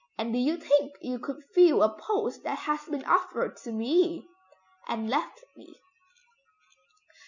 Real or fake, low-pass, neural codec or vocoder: real; 7.2 kHz; none